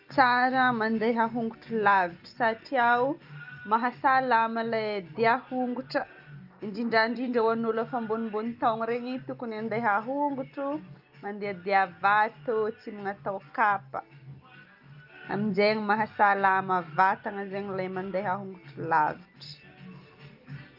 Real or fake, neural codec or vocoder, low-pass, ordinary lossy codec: real; none; 5.4 kHz; Opus, 24 kbps